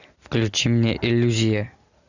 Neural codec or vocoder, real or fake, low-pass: none; real; 7.2 kHz